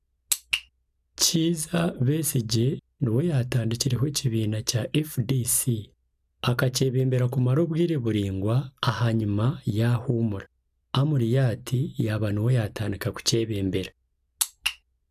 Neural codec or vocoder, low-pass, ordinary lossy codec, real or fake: none; 14.4 kHz; none; real